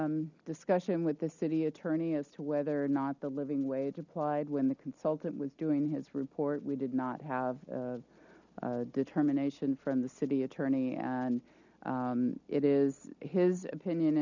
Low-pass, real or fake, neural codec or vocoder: 7.2 kHz; real; none